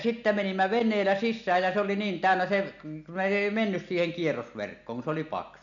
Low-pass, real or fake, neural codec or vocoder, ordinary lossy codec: 7.2 kHz; real; none; none